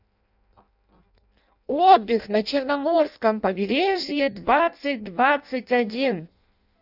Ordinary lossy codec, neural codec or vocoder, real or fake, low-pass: none; codec, 16 kHz in and 24 kHz out, 0.6 kbps, FireRedTTS-2 codec; fake; 5.4 kHz